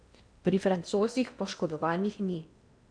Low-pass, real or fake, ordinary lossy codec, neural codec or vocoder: 9.9 kHz; fake; none; codec, 16 kHz in and 24 kHz out, 0.8 kbps, FocalCodec, streaming, 65536 codes